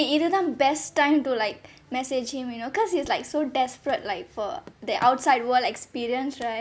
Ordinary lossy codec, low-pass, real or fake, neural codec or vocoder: none; none; real; none